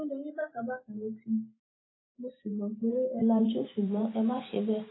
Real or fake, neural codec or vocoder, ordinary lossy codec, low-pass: fake; vocoder, 44.1 kHz, 128 mel bands every 512 samples, BigVGAN v2; AAC, 16 kbps; 7.2 kHz